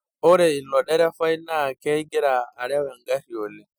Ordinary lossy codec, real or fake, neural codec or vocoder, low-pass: none; real; none; none